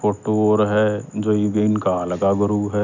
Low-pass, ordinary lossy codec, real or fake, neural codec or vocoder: 7.2 kHz; none; real; none